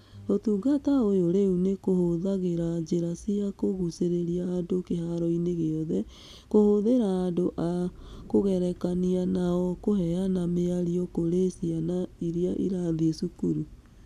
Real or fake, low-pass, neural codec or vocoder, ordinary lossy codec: real; 14.4 kHz; none; none